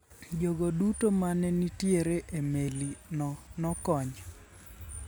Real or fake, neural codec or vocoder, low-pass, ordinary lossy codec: real; none; none; none